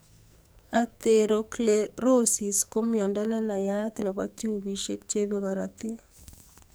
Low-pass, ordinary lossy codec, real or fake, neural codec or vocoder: none; none; fake; codec, 44.1 kHz, 2.6 kbps, SNAC